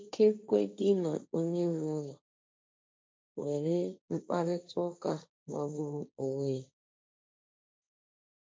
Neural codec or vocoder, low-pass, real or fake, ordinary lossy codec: codec, 16 kHz, 1.1 kbps, Voila-Tokenizer; none; fake; none